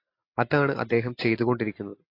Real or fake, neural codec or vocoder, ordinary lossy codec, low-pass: real; none; AAC, 32 kbps; 5.4 kHz